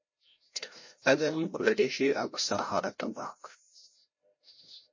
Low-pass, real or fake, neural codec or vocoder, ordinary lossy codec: 7.2 kHz; fake; codec, 16 kHz, 0.5 kbps, FreqCodec, larger model; MP3, 32 kbps